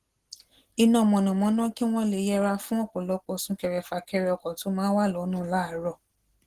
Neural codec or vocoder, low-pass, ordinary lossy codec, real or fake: none; 14.4 kHz; Opus, 16 kbps; real